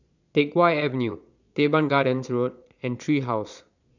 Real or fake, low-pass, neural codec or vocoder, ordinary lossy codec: fake; 7.2 kHz; vocoder, 22.05 kHz, 80 mel bands, Vocos; none